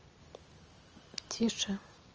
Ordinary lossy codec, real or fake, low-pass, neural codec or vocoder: Opus, 24 kbps; real; 7.2 kHz; none